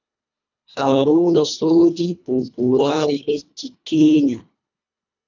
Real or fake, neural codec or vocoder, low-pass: fake; codec, 24 kHz, 1.5 kbps, HILCodec; 7.2 kHz